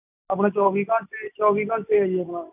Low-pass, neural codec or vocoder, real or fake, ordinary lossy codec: 3.6 kHz; none; real; AAC, 32 kbps